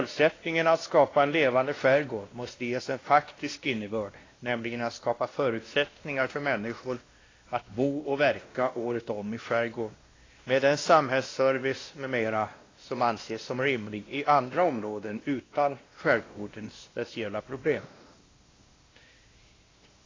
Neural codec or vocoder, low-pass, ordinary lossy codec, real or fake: codec, 16 kHz, 1 kbps, X-Codec, WavLM features, trained on Multilingual LibriSpeech; 7.2 kHz; AAC, 32 kbps; fake